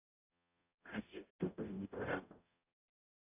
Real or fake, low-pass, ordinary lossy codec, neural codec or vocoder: fake; 3.6 kHz; AAC, 32 kbps; codec, 44.1 kHz, 0.9 kbps, DAC